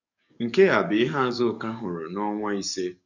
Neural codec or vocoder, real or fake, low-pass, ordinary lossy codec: codec, 44.1 kHz, 7.8 kbps, DAC; fake; 7.2 kHz; none